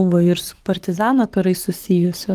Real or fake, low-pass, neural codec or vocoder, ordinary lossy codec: fake; 14.4 kHz; codec, 44.1 kHz, 7.8 kbps, DAC; Opus, 24 kbps